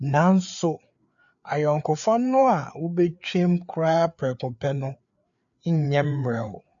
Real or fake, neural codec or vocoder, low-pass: fake; codec, 16 kHz, 4 kbps, FreqCodec, larger model; 7.2 kHz